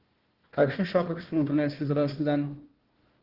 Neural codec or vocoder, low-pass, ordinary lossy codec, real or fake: codec, 16 kHz, 1 kbps, FunCodec, trained on Chinese and English, 50 frames a second; 5.4 kHz; Opus, 16 kbps; fake